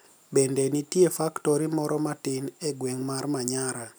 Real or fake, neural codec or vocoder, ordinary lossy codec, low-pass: real; none; none; none